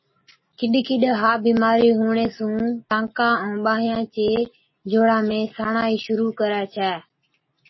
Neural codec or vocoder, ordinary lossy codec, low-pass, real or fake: none; MP3, 24 kbps; 7.2 kHz; real